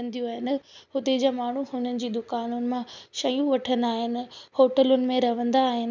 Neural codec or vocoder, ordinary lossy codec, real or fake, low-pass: none; none; real; 7.2 kHz